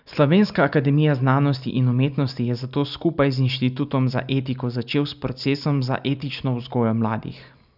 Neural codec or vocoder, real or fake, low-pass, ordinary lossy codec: none; real; 5.4 kHz; none